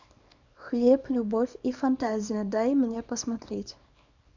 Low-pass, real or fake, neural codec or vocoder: 7.2 kHz; fake; codec, 24 kHz, 0.9 kbps, WavTokenizer, small release